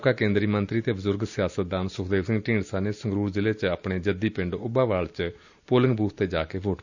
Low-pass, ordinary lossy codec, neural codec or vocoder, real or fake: 7.2 kHz; none; none; real